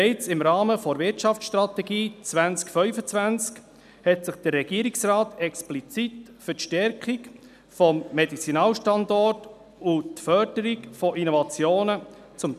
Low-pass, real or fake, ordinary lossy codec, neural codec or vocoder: 14.4 kHz; real; none; none